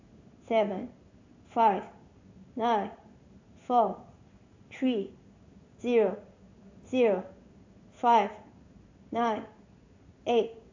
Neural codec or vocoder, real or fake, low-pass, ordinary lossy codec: codec, 16 kHz in and 24 kHz out, 1 kbps, XY-Tokenizer; fake; 7.2 kHz; AAC, 48 kbps